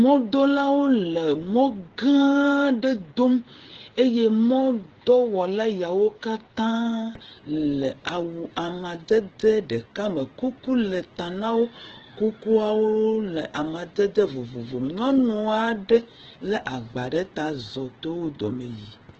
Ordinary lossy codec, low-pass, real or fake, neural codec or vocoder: Opus, 16 kbps; 7.2 kHz; fake; codec, 16 kHz, 8 kbps, FreqCodec, smaller model